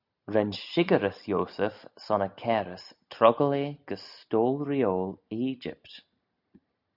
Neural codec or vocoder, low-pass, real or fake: none; 5.4 kHz; real